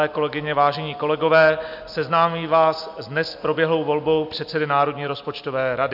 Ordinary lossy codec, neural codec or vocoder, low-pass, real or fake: AAC, 48 kbps; none; 5.4 kHz; real